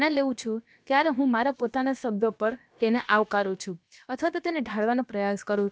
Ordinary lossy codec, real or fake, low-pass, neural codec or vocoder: none; fake; none; codec, 16 kHz, about 1 kbps, DyCAST, with the encoder's durations